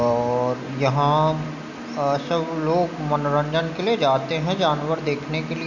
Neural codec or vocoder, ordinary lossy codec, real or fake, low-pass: none; none; real; 7.2 kHz